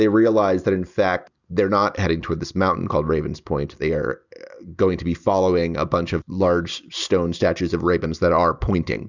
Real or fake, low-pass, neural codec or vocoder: real; 7.2 kHz; none